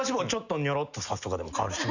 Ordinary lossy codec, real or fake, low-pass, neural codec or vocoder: none; real; 7.2 kHz; none